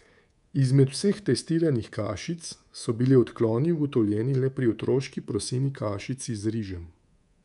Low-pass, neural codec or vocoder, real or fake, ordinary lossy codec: 10.8 kHz; codec, 24 kHz, 3.1 kbps, DualCodec; fake; none